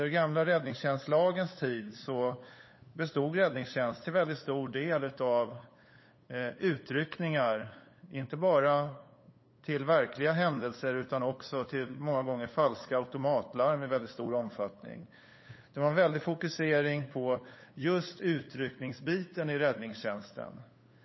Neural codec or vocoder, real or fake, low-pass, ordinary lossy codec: codec, 16 kHz, 8 kbps, FunCodec, trained on LibriTTS, 25 frames a second; fake; 7.2 kHz; MP3, 24 kbps